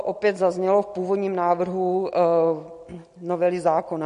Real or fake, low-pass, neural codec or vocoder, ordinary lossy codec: real; 14.4 kHz; none; MP3, 48 kbps